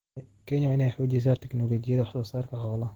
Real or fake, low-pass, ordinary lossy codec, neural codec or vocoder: real; 19.8 kHz; Opus, 16 kbps; none